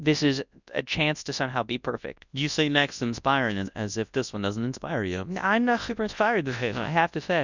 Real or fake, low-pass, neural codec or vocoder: fake; 7.2 kHz; codec, 24 kHz, 0.9 kbps, WavTokenizer, large speech release